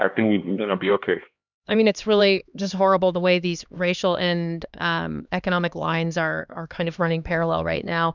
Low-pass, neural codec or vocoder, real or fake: 7.2 kHz; codec, 16 kHz, 2 kbps, X-Codec, HuBERT features, trained on LibriSpeech; fake